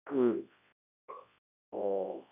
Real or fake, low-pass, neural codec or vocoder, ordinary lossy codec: fake; 3.6 kHz; codec, 24 kHz, 0.9 kbps, WavTokenizer, large speech release; none